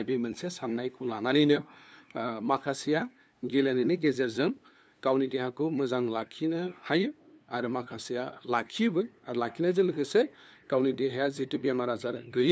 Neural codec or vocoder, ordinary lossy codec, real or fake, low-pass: codec, 16 kHz, 2 kbps, FunCodec, trained on LibriTTS, 25 frames a second; none; fake; none